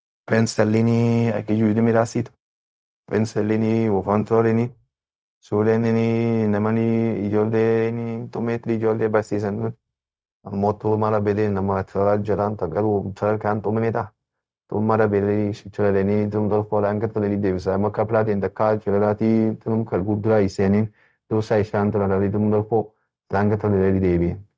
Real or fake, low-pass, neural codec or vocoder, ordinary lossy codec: fake; none; codec, 16 kHz, 0.4 kbps, LongCat-Audio-Codec; none